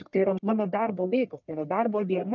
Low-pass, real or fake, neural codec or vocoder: 7.2 kHz; fake; codec, 44.1 kHz, 1.7 kbps, Pupu-Codec